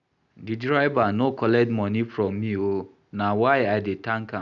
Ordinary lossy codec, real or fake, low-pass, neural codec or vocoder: none; real; 7.2 kHz; none